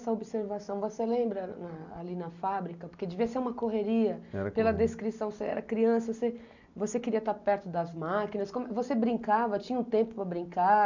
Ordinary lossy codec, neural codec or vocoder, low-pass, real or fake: none; none; 7.2 kHz; real